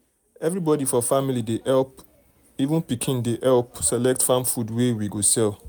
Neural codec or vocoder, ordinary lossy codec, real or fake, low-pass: none; none; real; none